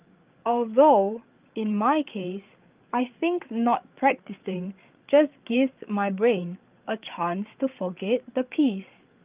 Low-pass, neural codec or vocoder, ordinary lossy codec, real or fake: 3.6 kHz; codec, 16 kHz, 8 kbps, FreqCodec, larger model; Opus, 32 kbps; fake